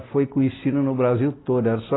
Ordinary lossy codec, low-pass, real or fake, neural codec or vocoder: AAC, 16 kbps; 7.2 kHz; real; none